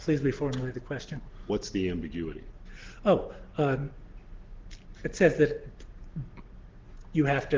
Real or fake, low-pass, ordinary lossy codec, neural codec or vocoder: real; 7.2 kHz; Opus, 16 kbps; none